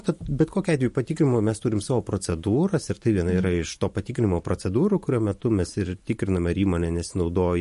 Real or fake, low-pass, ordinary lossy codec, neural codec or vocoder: fake; 14.4 kHz; MP3, 48 kbps; vocoder, 44.1 kHz, 128 mel bands every 512 samples, BigVGAN v2